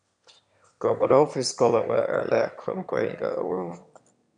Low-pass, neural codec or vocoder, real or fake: 9.9 kHz; autoencoder, 22.05 kHz, a latent of 192 numbers a frame, VITS, trained on one speaker; fake